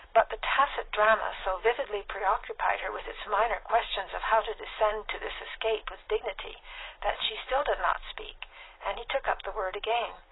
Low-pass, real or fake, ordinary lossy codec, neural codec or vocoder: 7.2 kHz; real; AAC, 16 kbps; none